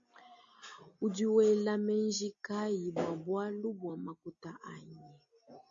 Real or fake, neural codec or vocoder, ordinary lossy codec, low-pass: real; none; AAC, 64 kbps; 7.2 kHz